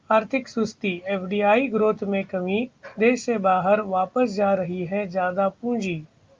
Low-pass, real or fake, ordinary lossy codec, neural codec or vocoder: 7.2 kHz; real; Opus, 24 kbps; none